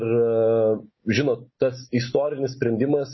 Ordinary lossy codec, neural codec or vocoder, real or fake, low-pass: MP3, 24 kbps; none; real; 7.2 kHz